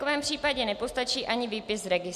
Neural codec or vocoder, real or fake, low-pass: none; real; 14.4 kHz